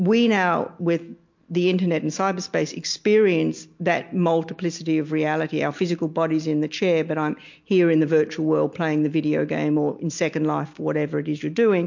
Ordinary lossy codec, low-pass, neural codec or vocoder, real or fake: MP3, 48 kbps; 7.2 kHz; none; real